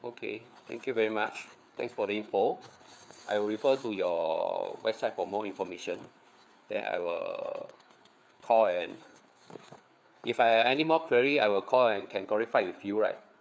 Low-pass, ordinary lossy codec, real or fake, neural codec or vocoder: none; none; fake; codec, 16 kHz, 4 kbps, FreqCodec, larger model